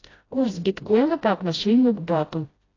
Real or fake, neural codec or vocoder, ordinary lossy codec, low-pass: fake; codec, 16 kHz, 0.5 kbps, FreqCodec, smaller model; AAC, 32 kbps; 7.2 kHz